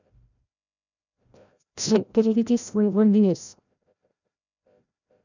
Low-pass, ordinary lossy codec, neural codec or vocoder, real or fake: 7.2 kHz; none; codec, 16 kHz, 0.5 kbps, FreqCodec, larger model; fake